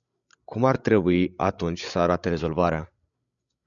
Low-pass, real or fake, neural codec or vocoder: 7.2 kHz; fake; codec, 16 kHz, 16 kbps, FreqCodec, larger model